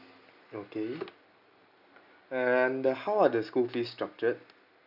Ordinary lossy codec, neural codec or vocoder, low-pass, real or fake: none; none; 5.4 kHz; real